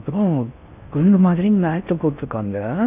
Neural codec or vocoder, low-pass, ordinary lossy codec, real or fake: codec, 16 kHz in and 24 kHz out, 0.6 kbps, FocalCodec, streaming, 4096 codes; 3.6 kHz; none; fake